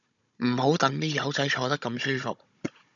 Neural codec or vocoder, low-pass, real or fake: codec, 16 kHz, 16 kbps, FunCodec, trained on Chinese and English, 50 frames a second; 7.2 kHz; fake